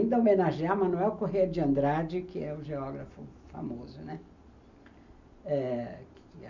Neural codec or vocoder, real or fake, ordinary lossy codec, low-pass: none; real; none; 7.2 kHz